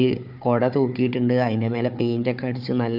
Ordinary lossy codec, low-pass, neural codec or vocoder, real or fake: none; 5.4 kHz; codec, 16 kHz, 4 kbps, FunCodec, trained on Chinese and English, 50 frames a second; fake